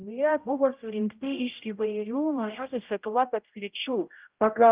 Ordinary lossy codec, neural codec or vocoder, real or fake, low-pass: Opus, 24 kbps; codec, 16 kHz, 0.5 kbps, X-Codec, HuBERT features, trained on general audio; fake; 3.6 kHz